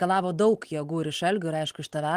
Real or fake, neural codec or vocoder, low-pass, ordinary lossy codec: real; none; 14.4 kHz; Opus, 24 kbps